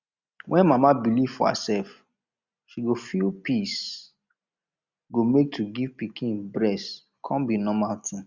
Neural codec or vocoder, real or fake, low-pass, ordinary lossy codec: none; real; 7.2 kHz; Opus, 64 kbps